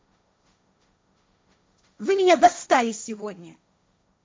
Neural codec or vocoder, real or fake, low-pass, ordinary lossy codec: codec, 16 kHz, 1.1 kbps, Voila-Tokenizer; fake; none; none